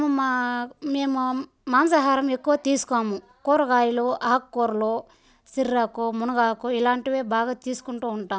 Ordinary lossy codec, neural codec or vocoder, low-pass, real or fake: none; none; none; real